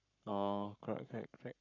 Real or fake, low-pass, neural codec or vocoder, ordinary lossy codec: fake; 7.2 kHz; codec, 44.1 kHz, 7.8 kbps, Pupu-Codec; none